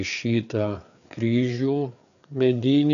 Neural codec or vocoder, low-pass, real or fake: codec, 16 kHz, 4 kbps, FreqCodec, larger model; 7.2 kHz; fake